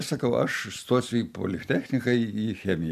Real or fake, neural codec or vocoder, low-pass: real; none; 14.4 kHz